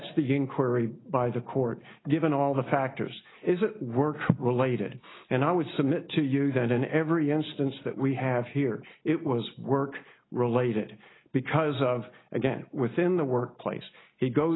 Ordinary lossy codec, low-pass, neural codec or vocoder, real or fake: AAC, 16 kbps; 7.2 kHz; none; real